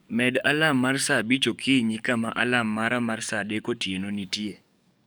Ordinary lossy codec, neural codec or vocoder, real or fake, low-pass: none; codec, 44.1 kHz, 7.8 kbps, DAC; fake; none